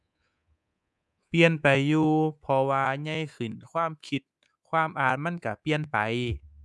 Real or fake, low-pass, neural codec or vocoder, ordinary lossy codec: fake; none; codec, 24 kHz, 3.1 kbps, DualCodec; none